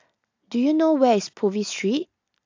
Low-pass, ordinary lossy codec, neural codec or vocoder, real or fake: 7.2 kHz; AAC, 48 kbps; none; real